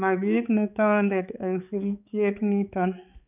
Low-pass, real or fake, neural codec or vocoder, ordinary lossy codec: 3.6 kHz; fake; codec, 16 kHz, 4 kbps, X-Codec, HuBERT features, trained on balanced general audio; none